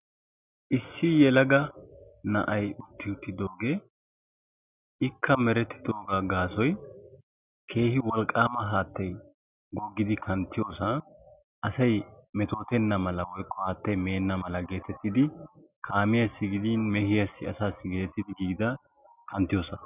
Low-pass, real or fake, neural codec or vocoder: 3.6 kHz; real; none